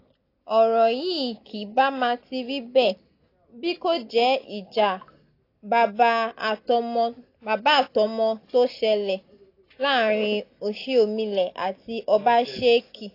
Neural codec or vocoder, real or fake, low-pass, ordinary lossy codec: none; real; 5.4 kHz; AAC, 32 kbps